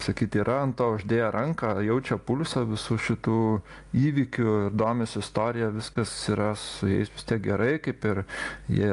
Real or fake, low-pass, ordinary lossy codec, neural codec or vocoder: real; 10.8 kHz; AAC, 64 kbps; none